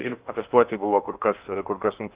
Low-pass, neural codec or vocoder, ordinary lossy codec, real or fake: 3.6 kHz; codec, 16 kHz in and 24 kHz out, 0.8 kbps, FocalCodec, streaming, 65536 codes; Opus, 32 kbps; fake